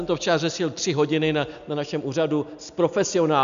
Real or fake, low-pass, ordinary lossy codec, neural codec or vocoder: real; 7.2 kHz; MP3, 96 kbps; none